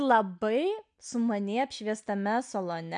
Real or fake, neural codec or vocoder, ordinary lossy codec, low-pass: real; none; MP3, 96 kbps; 9.9 kHz